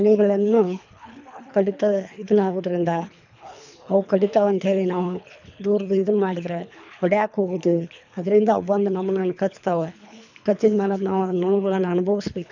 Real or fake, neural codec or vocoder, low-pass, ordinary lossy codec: fake; codec, 24 kHz, 3 kbps, HILCodec; 7.2 kHz; none